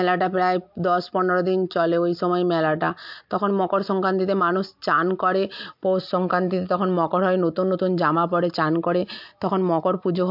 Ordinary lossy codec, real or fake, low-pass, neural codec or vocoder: MP3, 48 kbps; real; 5.4 kHz; none